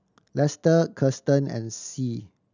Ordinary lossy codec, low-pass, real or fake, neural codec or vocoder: none; 7.2 kHz; real; none